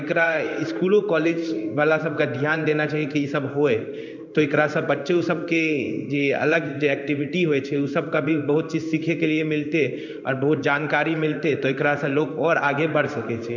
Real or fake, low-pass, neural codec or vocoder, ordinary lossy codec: fake; 7.2 kHz; codec, 16 kHz in and 24 kHz out, 1 kbps, XY-Tokenizer; none